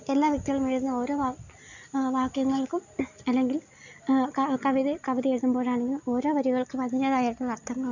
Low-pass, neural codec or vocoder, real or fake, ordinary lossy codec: 7.2 kHz; none; real; none